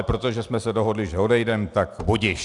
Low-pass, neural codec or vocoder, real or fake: 10.8 kHz; none; real